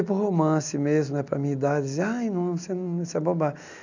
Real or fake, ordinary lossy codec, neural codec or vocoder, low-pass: real; none; none; 7.2 kHz